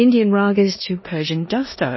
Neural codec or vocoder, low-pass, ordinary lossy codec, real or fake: codec, 16 kHz in and 24 kHz out, 0.9 kbps, LongCat-Audio-Codec, four codebook decoder; 7.2 kHz; MP3, 24 kbps; fake